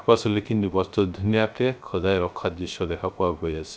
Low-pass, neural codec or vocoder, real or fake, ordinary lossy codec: none; codec, 16 kHz, 0.3 kbps, FocalCodec; fake; none